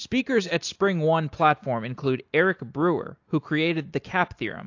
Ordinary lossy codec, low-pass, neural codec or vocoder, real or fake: AAC, 48 kbps; 7.2 kHz; none; real